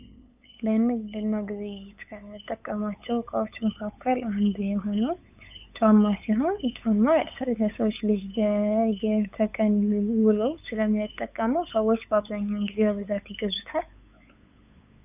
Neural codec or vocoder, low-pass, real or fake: codec, 16 kHz, 8 kbps, FunCodec, trained on LibriTTS, 25 frames a second; 3.6 kHz; fake